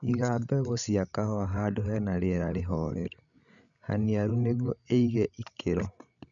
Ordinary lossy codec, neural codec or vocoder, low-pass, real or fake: none; codec, 16 kHz, 16 kbps, FreqCodec, larger model; 7.2 kHz; fake